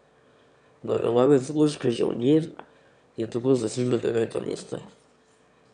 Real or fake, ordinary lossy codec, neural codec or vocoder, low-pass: fake; none; autoencoder, 22.05 kHz, a latent of 192 numbers a frame, VITS, trained on one speaker; 9.9 kHz